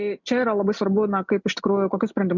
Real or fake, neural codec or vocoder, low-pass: real; none; 7.2 kHz